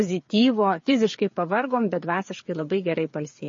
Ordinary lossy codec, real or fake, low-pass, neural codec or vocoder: MP3, 32 kbps; fake; 7.2 kHz; codec, 16 kHz, 8 kbps, FreqCodec, smaller model